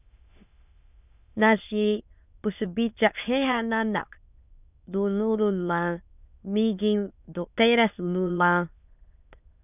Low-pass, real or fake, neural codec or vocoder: 3.6 kHz; fake; autoencoder, 22.05 kHz, a latent of 192 numbers a frame, VITS, trained on many speakers